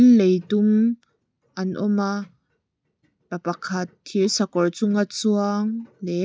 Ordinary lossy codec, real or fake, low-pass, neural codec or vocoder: none; real; none; none